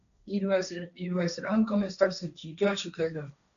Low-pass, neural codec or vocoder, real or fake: 7.2 kHz; codec, 16 kHz, 1.1 kbps, Voila-Tokenizer; fake